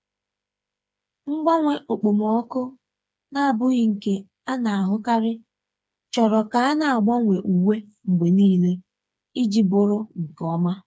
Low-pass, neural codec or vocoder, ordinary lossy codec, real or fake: none; codec, 16 kHz, 4 kbps, FreqCodec, smaller model; none; fake